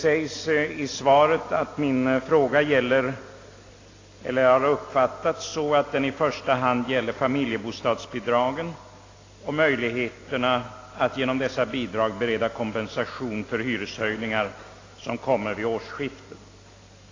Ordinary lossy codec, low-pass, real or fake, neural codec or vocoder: AAC, 32 kbps; 7.2 kHz; real; none